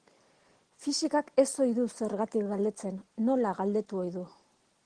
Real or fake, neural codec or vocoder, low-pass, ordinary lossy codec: real; none; 9.9 kHz; Opus, 16 kbps